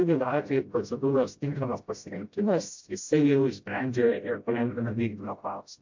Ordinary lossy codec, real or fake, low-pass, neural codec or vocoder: MP3, 48 kbps; fake; 7.2 kHz; codec, 16 kHz, 0.5 kbps, FreqCodec, smaller model